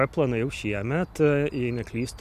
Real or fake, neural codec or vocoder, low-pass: real; none; 14.4 kHz